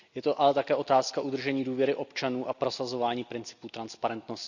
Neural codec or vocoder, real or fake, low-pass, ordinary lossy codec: none; real; 7.2 kHz; none